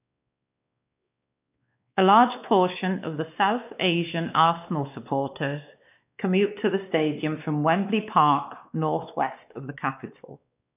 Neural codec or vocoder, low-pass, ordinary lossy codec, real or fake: codec, 16 kHz, 2 kbps, X-Codec, WavLM features, trained on Multilingual LibriSpeech; 3.6 kHz; none; fake